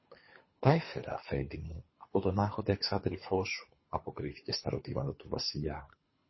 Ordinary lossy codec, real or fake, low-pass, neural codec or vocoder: MP3, 24 kbps; fake; 7.2 kHz; codec, 24 kHz, 3 kbps, HILCodec